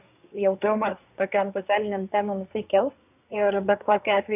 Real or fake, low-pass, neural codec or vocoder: fake; 3.6 kHz; codec, 24 kHz, 1 kbps, SNAC